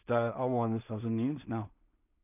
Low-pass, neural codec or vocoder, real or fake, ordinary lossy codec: 3.6 kHz; codec, 16 kHz in and 24 kHz out, 0.4 kbps, LongCat-Audio-Codec, two codebook decoder; fake; none